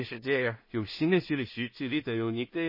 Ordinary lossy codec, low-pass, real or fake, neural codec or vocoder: MP3, 24 kbps; 5.4 kHz; fake; codec, 16 kHz in and 24 kHz out, 0.4 kbps, LongCat-Audio-Codec, two codebook decoder